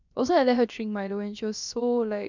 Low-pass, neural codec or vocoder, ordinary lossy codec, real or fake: 7.2 kHz; codec, 16 kHz, about 1 kbps, DyCAST, with the encoder's durations; none; fake